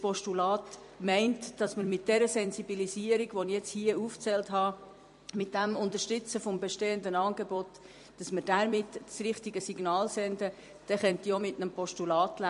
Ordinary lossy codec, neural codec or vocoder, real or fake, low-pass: MP3, 48 kbps; vocoder, 44.1 kHz, 128 mel bands every 256 samples, BigVGAN v2; fake; 14.4 kHz